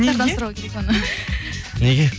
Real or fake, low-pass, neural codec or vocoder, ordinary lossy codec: real; none; none; none